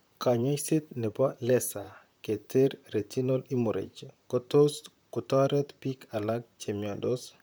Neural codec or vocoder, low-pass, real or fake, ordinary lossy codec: vocoder, 44.1 kHz, 128 mel bands, Pupu-Vocoder; none; fake; none